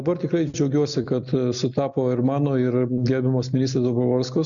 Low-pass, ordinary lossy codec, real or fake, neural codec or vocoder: 7.2 kHz; MP3, 96 kbps; real; none